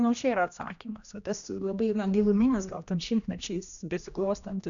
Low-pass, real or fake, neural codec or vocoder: 7.2 kHz; fake; codec, 16 kHz, 1 kbps, X-Codec, HuBERT features, trained on general audio